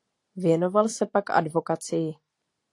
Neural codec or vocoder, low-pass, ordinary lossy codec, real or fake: none; 10.8 kHz; AAC, 48 kbps; real